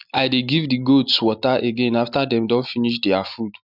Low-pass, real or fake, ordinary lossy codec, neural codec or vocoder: 5.4 kHz; real; none; none